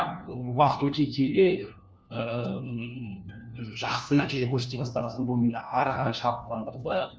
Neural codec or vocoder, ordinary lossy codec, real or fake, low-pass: codec, 16 kHz, 1 kbps, FunCodec, trained on LibriTTS, 50 frames a second; none; fake; none